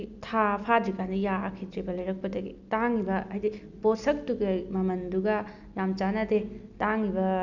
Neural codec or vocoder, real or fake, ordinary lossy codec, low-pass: none; real; none; 7.2 kHz